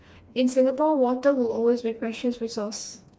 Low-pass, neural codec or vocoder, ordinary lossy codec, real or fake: none; codec, 16 kHz, 2 kbps, FreqCodec, smaller model; none; fake